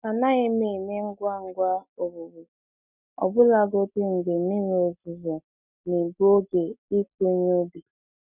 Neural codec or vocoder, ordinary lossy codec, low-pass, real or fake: none; none; 3.6 kHz; real